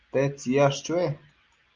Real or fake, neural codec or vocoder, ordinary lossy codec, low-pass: real; none; Opus, 24 kbps; 7.2 kHz